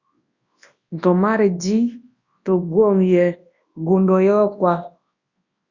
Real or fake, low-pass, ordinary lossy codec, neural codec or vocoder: fake; 7.2 kHz; Opus, 64 kbps; codec, 24 kHz, 0.9 kbps, WavTokenizer, large speech release